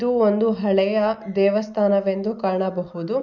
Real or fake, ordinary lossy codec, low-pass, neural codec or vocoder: real; none; 7.2 kHz; none